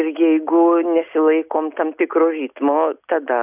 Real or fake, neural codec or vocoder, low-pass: real; none; 3.6 kHz